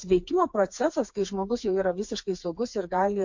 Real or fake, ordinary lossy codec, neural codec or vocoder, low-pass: fake; MP3, 48 kbps; codec, 16 kHz, 4 kbps, FreqCodec, smaller model; 7.2 kHz